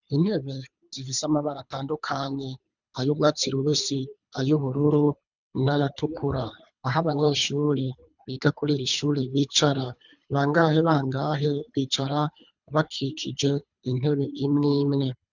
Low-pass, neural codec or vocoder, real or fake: 7.2 kHz; codec, 24 kHz, 3 kbps, HILCodec; fake